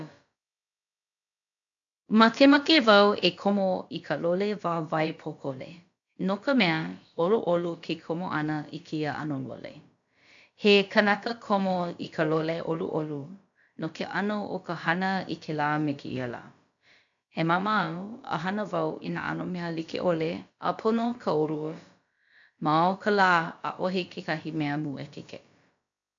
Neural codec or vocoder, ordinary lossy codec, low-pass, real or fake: codec, 16 kHz, about 1 kbps, DyCAST, with the encoder's durations; AAC, 64 kbps; 7.2 kHz; fake